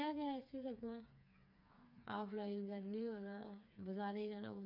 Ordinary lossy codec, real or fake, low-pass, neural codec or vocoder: none; fake; 5.4 kHz; codec, 16 kHz, 4 kbps, FreqCodec, smaller model